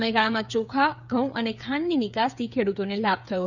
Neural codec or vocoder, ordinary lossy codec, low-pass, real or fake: codec, 16 kHz, 8 kbps, FreqCodec, smaller model; none; 7.2 kHz; fake